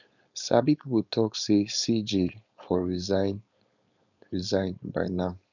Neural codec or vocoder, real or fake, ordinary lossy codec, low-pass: codec, 16 kHz, 4.8 kbps, FACodec; fake; none; 7.2 kHz